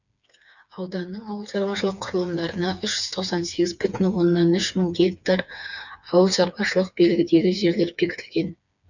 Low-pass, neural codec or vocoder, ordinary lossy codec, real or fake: 7.2 kHz; codec, 16 kHz, 4 kbps, FreqCodec, smaller model; none; fake